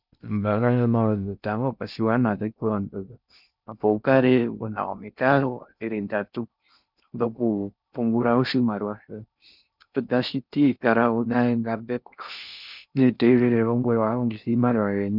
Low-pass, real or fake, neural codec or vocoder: 5.4 kHz; fake; codec, 16 kHz in and 24 kHz out, 0.6 kbps, FocalCodec, streaming, 4096 codes